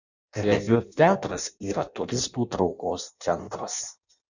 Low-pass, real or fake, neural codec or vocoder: 7.2 kHz; fake; codec, 16 kHz in and 24 kHz out, 0.6 kbps, FireRedTTS-2 codec